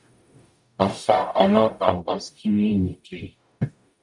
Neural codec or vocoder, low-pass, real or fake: codec, 44.1 kHz, 0.9 kbps, DAC; 10.8 kHz; fake